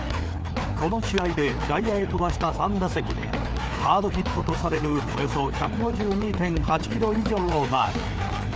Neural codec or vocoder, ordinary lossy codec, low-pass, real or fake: codec, 16 kHz, 4 kbps, FreqCodec, larger model; none; none; fake